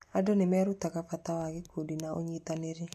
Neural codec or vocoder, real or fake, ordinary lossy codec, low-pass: none; real; MP3, 64 kbps; 14.4 kHz